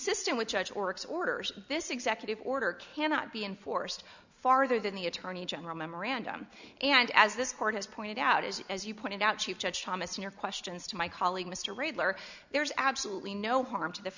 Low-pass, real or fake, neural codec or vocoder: 7.2 kHz; real; none